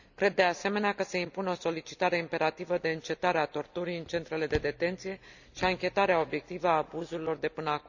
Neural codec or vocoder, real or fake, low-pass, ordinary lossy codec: none; real; 7.2 kHz; none